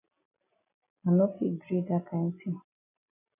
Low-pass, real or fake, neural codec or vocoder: 3.6 kHz; real; none